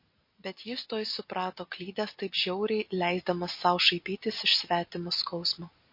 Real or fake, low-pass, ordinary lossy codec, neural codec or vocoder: real; 5.4 kHz; MP3, 32 kbps; none